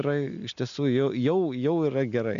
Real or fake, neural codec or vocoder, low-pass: real; none; 7.2 kHz